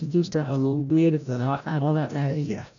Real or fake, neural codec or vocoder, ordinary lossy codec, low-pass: fake; codec, 16 kHz, 0.5 kbps, FreqCodec, larger model; none; 7.2 kHz